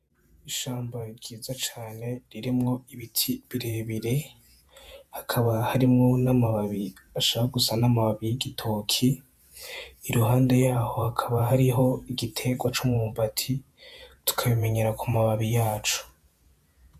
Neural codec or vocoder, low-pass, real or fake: vocoder, 48 kHz, 128 mel bands, Vocos; 14.4 kHz; fake